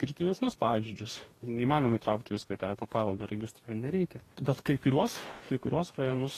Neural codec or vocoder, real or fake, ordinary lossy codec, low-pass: codec, 44.1 kHz, 2.6 kbps, DAC; fake; AAC, 48 kbps; 14.4 kHz